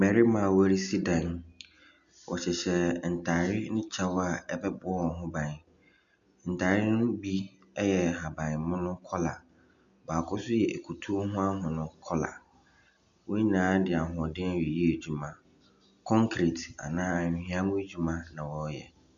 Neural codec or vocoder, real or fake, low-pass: none; real; 7.2 kHz